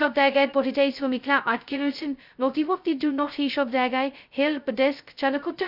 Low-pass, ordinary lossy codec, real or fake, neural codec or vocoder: 5.4 kHz; none; fake; codec, 16 kHz, 0.2 kbps, FocalCodec